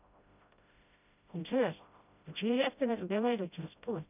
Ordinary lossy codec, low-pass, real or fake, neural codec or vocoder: none; 3.6 kHz; fake; codec, 16 kHz, 0.5 kbps, FreqCodec, smaller model